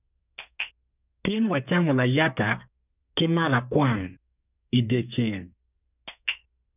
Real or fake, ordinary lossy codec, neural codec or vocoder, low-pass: fake; none; codec, 32 kHz, 1.9 kbps, SNAC; 3.6 kHz